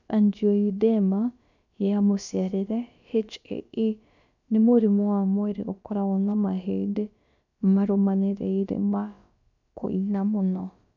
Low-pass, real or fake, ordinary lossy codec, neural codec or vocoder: 7.2 kHz; fake; none; codec, 16 kHz, about 1 kbps, DyCAST, with the encoder's durations